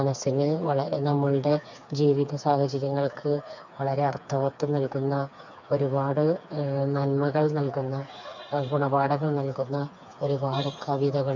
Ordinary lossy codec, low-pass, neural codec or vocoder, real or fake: none; 7.2 kHz; codec, 16 kHz, 4 kbps, FreqCodec, smaller model; fake